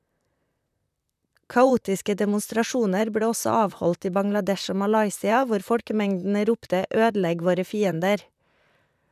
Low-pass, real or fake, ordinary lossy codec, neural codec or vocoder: 14.4 kHz; fake; none; vocoder, 44.1 kHz, 128 mel bands, Pupu-Vocoder